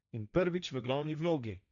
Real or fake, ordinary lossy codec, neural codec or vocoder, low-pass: fake; none; codec, 16 kHz, 1.1 kbps, Voila-Tokenizer; 7.2 kHz